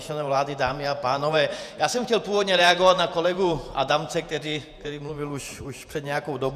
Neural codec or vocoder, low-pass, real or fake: vocoder, 48 kHz, 128 mel bands, Vocos; 14.4 kHz; fake